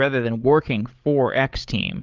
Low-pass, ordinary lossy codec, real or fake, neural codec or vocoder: 7.2 kHz; Opus, 24 kbps; fake; codec, 16 kHz, 16 kbps, FreqCodec, larger model